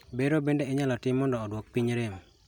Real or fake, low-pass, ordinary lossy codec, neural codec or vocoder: real; 19.8 kHz; none; none